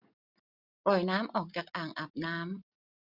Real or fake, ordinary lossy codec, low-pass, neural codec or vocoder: real; none; 5.4 kHz; none